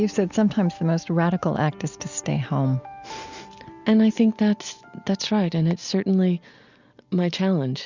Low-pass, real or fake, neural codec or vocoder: 7.2 kHz; real; none